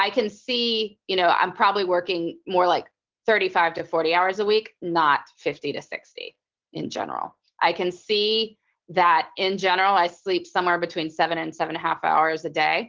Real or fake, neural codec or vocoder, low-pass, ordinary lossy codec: real; none; 7.2 kHz; Opus, 16 kbps